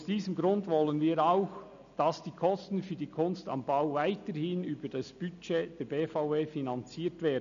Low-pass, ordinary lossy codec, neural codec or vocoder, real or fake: 7.2 kHz; none; none; real